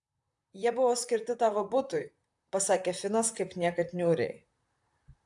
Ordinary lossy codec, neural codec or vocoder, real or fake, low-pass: AAC, 64 kbps; vocoder, 44.1 kHz, 128 mel bands, Pupu-Vocoder; fake; 10.8 kHz